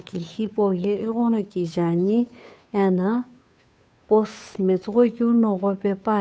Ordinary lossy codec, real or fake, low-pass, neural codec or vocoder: none; fake; none; codec, 16 kHz, 2 kbps, FunCodec, trained on Chinese and English, 25 frames a second